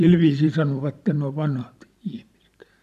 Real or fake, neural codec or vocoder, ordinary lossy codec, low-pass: fake; vocoder, 44.1 kHz, 128 mel bands every 512 samples, BigVGAN v2; none; 14.4 kHz